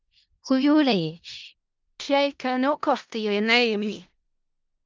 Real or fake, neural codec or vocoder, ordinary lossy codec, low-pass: fake; codec, 16 kHz in and 24 kHz out, 0.4 kbps, LongCat-Audio-Codec, four codebook decoder; Opus, 32 kbps; 7.2 kHz